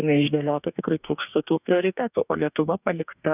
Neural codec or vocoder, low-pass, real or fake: codec, 44.1 kHz, 2.6 kbps, DAC; 3.6 kHz; fake